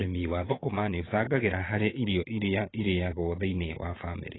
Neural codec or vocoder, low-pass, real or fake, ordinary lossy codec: vocoder, 22.05 kHz, 80 mel bands, Vocos; 7.2 kHz; fake; AAC, 16 kbps